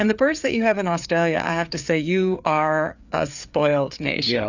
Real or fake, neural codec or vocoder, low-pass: fake; codec, 16 kHz, 4 kbps, FreqCodec, larger model; 7.2 kHz